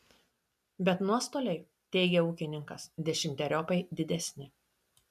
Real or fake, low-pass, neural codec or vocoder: real; 14.4 kHz; none